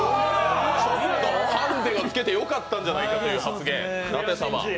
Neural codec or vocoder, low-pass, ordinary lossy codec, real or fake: none; none; none; real